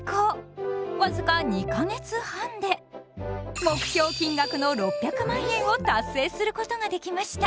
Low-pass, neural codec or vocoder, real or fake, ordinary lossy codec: none; none; real; none